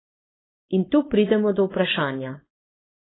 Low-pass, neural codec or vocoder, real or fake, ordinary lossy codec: 7.2 kHz; codec, 16 kHz, 4.8 kbps, FACodec; fake; AAC, 16 kbps